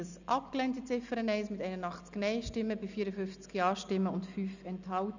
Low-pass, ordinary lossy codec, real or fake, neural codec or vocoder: 7.2 kHz; none; real; none